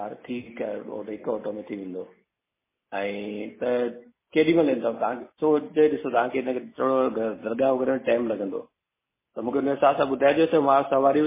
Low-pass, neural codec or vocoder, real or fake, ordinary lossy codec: 3.6 kHz; none; real; MP3, 16 kbps